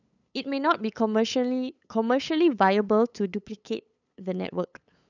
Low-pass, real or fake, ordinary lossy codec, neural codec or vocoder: 7.2 kHz; fake; none; codec, 16 kHz, 8 kbps, FunCodec, trained on LibriTTS, 25 frames a second